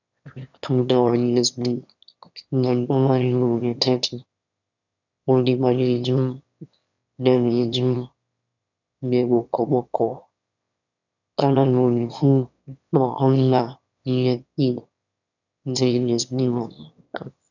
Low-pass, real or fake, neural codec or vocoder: 7.2 kHz; fake; autoencoder, 22.05 kHz, a latent of 192 numbers a frame, VITS, trained on one speaker